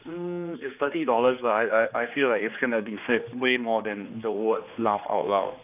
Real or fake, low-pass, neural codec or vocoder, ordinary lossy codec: fake; 3.6 kHz; codec, 16 kHz, 2 kbps, X-Codec, HuBERT features, trained on general audio; none